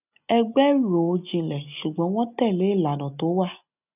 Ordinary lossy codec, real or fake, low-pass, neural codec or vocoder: none; real; 3.6 kHz; none